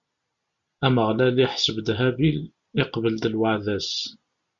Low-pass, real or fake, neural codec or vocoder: 7.2 kHz; real; none